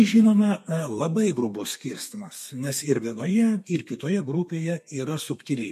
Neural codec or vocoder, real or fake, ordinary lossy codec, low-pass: codec, 32 kHz, 1.9 kbps, SNAC; fake; MP3, 64 kbps; 14.4 kHz